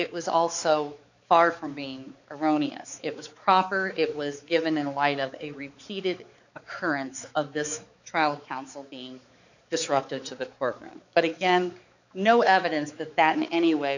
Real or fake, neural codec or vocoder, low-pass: fake; codec, 16 kHz, 4 kbps, X-Codec, HuBERT features, trained on balanced general audio; 7.2 kHz